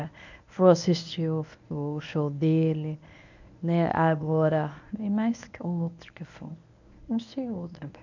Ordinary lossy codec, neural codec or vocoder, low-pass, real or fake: none; codec, 24 kHz, 0.9 kbps, WavTokenizer, medium speech release version 1; 7.2 kHz; fake